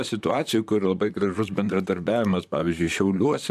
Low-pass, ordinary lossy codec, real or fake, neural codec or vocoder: 14.4 kHz; AAC, 96 kbps; fake; vocoder, 44.1 kHz, 128 mel bands, Pupu-Vocoder